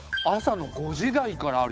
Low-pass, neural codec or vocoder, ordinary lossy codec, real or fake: none; codec, 16 kHz, 8 kbps, FunCodec, trained on Chinese and English, 25 frames a second; none; fake